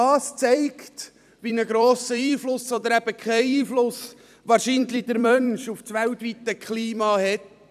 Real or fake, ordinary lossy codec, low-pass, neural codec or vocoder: fake; none; 14.4 kHz; vocoder, 44.1 kHz, 128 mel bands every 256 samples, BigVGAN v2